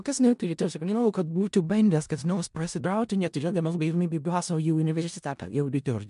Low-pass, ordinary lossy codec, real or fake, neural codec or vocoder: 10.8 kHz; MP3, 64 kbps; fake; codec, 16 kHz in and 24 kHz out, 0.4 kbps, LongCat-Audio-Codec, four codebook decoder